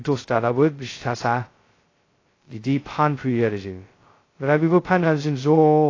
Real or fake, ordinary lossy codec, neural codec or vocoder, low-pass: fake; AAC, 32 kbps; codec, 16 kHz, 0.2 kbps, FocalCodec; 7.2 kHz